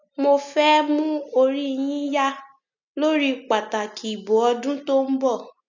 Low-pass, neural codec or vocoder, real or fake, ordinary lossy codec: 7.2 kHz; none; real; none